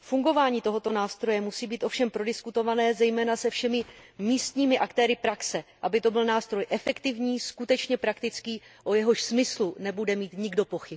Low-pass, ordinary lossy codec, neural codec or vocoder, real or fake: none; none; none; real